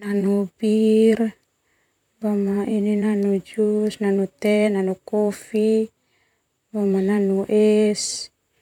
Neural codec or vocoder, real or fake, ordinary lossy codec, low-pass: vocoder, 44.1 kHz, 128 mel bands, Pupu-Vocoder; fake; none; 19.8 kHz